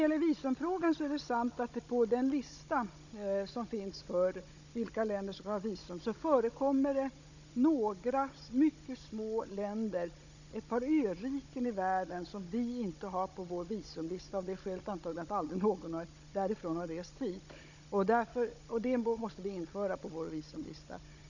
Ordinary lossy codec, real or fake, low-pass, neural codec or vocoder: none; fake; 7.2 kHz; codec, 16 kHz, 16 kbps, FunCodec, trained on Chinese and English, 50 frames a second